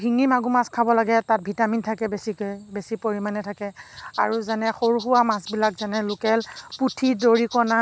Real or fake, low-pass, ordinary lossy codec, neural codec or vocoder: real; none; none; none